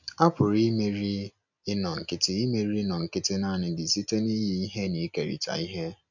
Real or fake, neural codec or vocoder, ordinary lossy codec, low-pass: real; none; none; 7.2 kHz